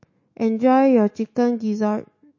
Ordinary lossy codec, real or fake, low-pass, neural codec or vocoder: MP3, 32 kbps; fake; 7.2 kHz; autoencoder, 48 kHz, 128 numbers a frame, DAC-VAE, trained on Japanese speech